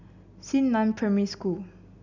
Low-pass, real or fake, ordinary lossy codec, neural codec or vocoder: 7.2 kHz; real; none; none